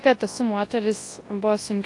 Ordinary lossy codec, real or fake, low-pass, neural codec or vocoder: AAC, 48 kbps; fake; 10.8 kHz; codec, 24 kHz, 0.9 kbps, WavTokenizer, large speech release